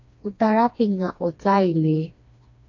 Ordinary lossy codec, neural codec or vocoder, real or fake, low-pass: AAC, 48 kbps; codec, 16 kHz, 2 kbps, FreqCodec, smaller model; fake; 7.2 kHz